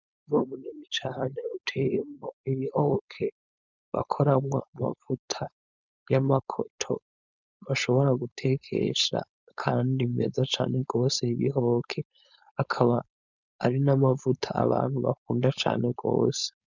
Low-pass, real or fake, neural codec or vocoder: 7.2 kHz; fake; codec, 16 kHz, 4.8 kbps, FACodec